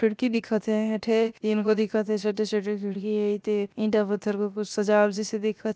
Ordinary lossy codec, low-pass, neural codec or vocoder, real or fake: none; none; codec, 16 kHz, 0.7 kbps, FocalCodec; fake